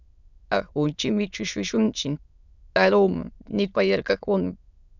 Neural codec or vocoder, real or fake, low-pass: autoencoder, 22.05 kHz, a latent of 192 numbers a frame, VITS, trained on many speakers; fake; 7.2 kHz